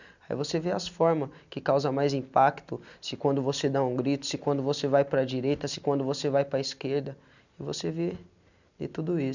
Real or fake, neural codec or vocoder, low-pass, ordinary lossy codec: real; none; 7.2 kHz; none